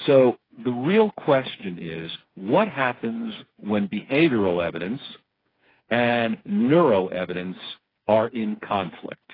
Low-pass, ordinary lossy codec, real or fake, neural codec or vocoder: 5.4 kHz; AAC, 24 kbps; fake; codec, 16 kHz, 4 kbps, FreqCodec, smaller model